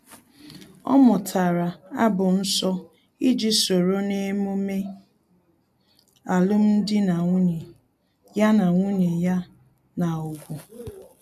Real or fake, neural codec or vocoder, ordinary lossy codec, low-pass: real; none; MP3, 96 kbps; 14.4 kHz